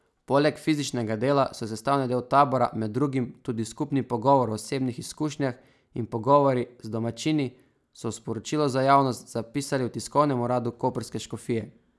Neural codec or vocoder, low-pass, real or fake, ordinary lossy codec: none; none; real; none